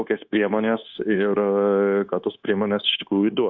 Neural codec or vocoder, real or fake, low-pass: codec, 16 kHz, 0.9 kbps, LongCat-Audio-Codec; fake; 7.2 kHz